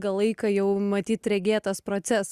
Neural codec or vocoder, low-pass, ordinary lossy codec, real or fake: none; 14.4 kHz; Opus, 64 kbps; real